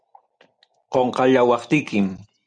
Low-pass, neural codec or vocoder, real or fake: 9.9 kHz; none; real